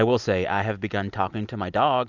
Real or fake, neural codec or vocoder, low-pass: real; none; 7.2 kHz